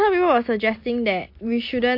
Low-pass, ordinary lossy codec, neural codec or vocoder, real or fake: 5.4 kHz; MP3, 32 kbps; none; real